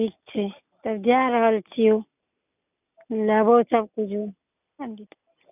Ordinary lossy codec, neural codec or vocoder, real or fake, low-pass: none; none; real; 3.6 kHz